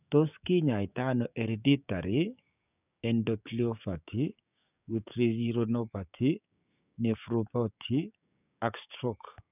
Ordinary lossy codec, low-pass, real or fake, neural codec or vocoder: none; 3.6 kHz; fake; codec, 16 kHz, 6 kbps, DAC